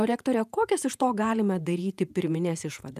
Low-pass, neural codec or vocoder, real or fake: 14.4 kHz; vocoder, 48 kHz, 128 mel bands, Vocos; fake